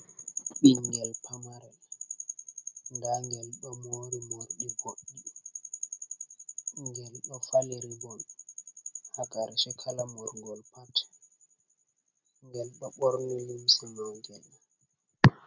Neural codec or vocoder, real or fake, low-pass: none; real; 7.2 kHz